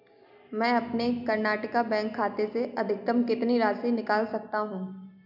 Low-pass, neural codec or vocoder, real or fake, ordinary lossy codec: 5.4 kHz; none; real; none